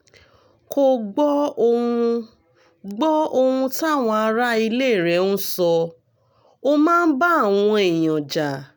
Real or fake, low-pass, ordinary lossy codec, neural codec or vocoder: real; none; none; none